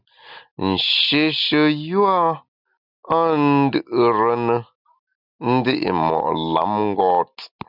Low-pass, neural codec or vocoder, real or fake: 5.4 kHz; none; real